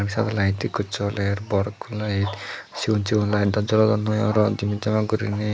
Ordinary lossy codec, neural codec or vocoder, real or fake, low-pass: none; none; real; none